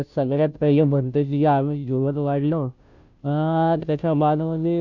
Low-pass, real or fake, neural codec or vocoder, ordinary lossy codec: 7.2 kHz; fake; codec, 16 kHz, 0.5 kbps, FunCodec, trained on Chinese and English, 25 frames a second; none